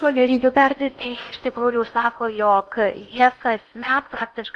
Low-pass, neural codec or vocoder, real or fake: 10.8 kHz; codec, 16 kHz in and 24 kHz out, 0.8 kbps, FocalCodec, streaming, 65536 codes; fake